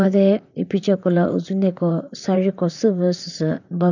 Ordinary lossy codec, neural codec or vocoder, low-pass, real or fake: none; vocoder, 44.1 kHz, 128 mel bands, Pupu-Vocoder; 7.2 kHz; fake